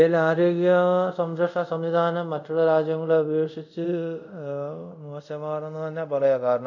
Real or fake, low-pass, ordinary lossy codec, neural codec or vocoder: fake; 7.2 kHz; none; codec, 24 kHz, 0.5 kbps, DualCodec